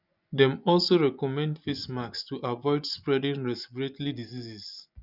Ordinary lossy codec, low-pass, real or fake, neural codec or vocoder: none; 5.4 kHz; fake; vocoder, 24 kHz, 100 mel bands, Vocos